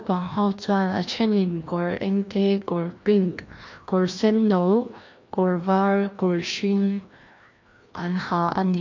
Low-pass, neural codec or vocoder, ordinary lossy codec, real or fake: 7.2 kHz; codec, 16 kHz, 1 kbps, FreqCodec, larger model; MP3, 48 kbps; fake